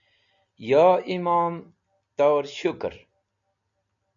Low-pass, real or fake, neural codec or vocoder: 7.2 kHz; real; none